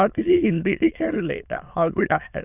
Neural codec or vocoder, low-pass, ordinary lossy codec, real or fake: autoencoder, 22.05 kHz, a latent of 192 numbers a frame, VITS, trained on many speakers; 3.6 kHz; none; fake